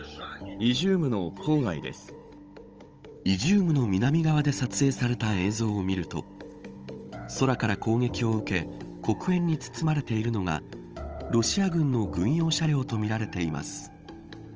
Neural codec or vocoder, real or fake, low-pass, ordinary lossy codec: codec, 16 kHz, 16 kbps, FunCodec, trained on Chinese and English, 50 frames a second; fake; 7.2 kHz; Opus, 24 kbps